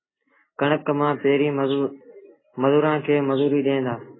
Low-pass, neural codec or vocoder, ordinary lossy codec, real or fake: 7.2 kHz; none; AAC, 16 kbps; real